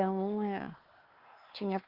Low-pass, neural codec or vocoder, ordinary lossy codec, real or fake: 5.4 kHz; codec, 16 kHz, 2 kbps, X-Codec, WavLM features, trained on Multilingual LibriSpeech; Opus, 16 kbps; fake